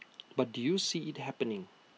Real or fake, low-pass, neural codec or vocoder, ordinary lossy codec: real; none; none; none